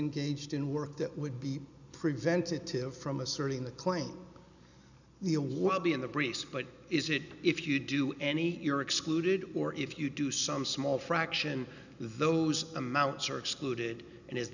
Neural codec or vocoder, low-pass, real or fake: none; 7.2 kHz; real